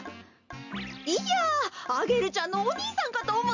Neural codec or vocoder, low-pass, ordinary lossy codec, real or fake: none; 7.2 kHz; none; real